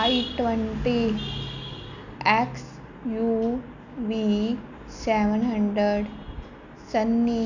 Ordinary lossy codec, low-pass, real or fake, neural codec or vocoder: none; 7.2 kHz; real; none